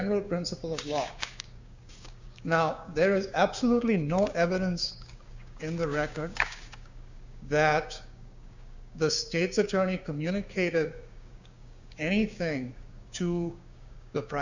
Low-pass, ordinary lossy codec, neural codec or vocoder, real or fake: 7.2 kHz; Opus, 64 kbps; codec, 16 kHz, 6 kbps, DAC; fake